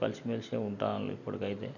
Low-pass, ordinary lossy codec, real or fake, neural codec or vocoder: 7.2 kHz; none; real; none